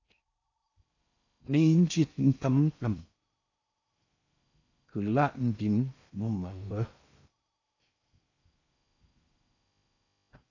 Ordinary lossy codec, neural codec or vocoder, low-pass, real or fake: AAC, 48 kbps; codec, 16 kHz in and 24 kHz out, 0.6 kbps, FocalCodec, streaming, 4096 codes; 7.2 kHz; fake